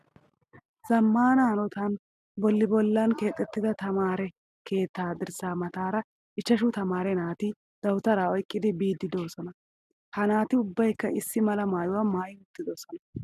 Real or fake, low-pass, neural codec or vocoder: real; 14.4 kHz; none